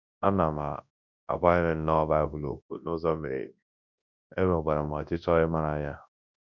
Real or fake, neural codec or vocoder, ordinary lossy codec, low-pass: fake; codec, 24 kHz, 0.9 kbps, WavTokenizer, large speech release; none; 7.2 kHz